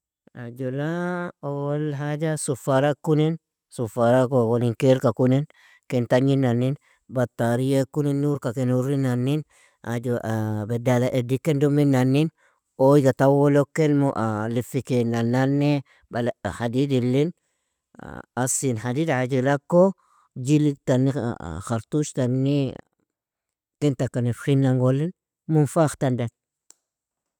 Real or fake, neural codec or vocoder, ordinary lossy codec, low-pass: real; none; none; 19.8 kHz